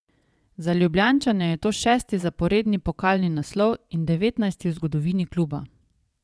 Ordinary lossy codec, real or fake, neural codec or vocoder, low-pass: none; fake; vocoder, 22.05 kHz, 80 mel bands, WaveNeXt; none